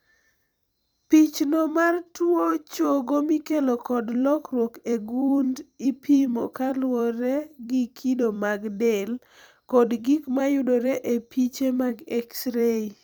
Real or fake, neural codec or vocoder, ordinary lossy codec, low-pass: fake; vocoder, 44.1 kHz, 128 mel bands every 256 samples, BigVGAN v2; none; none